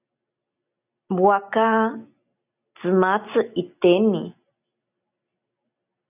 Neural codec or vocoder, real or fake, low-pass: none; real; 3.6 kHz